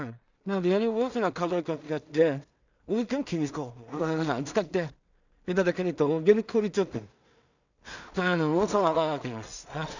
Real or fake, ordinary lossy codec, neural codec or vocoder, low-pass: fake; none; codec, 16 kHz in and 24 kHz out, 0.4 kbps, LongCat-Audio-Codec, two codebook decoder; 7.2 kHz